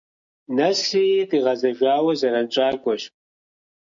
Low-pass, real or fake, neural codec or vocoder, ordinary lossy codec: 7.2 kHz; real; none; MP3, 64 kbps